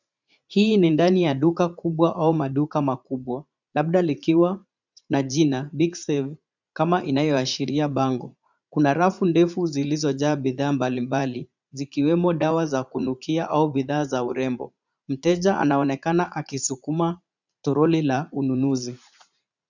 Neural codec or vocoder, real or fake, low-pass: vocoder, 44.1 kHz, 80 mel bands, Vocos; fake; 7.2 kHz